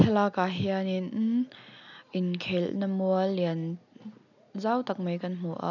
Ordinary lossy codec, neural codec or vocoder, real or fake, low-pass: none; none; real; 7.2 kHz